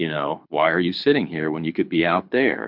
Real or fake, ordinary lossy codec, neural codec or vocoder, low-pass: fake; MP3, 48 kbps; codec, 24 kHz, 6 kbps, HILCodec; 5.4 kHz